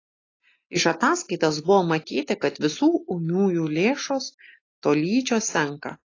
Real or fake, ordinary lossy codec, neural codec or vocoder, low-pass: real; AAC, 32 kbps; none; 7.2 kHz